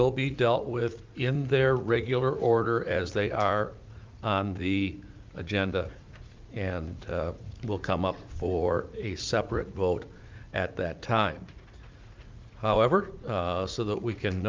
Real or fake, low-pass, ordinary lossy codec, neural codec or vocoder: fake; 7.2 kHz; Opus, 24 kbps; vocoder, 44.1 kHz, 80 mel bands, Vocos